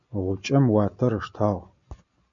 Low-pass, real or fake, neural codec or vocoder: 7.2 kHz; real; none